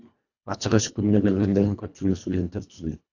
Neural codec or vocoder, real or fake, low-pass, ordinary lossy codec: codec, 24 kHz, 1.5 kbps, HILCodec; fake; 7.2 kHz; AAC, 48 kbps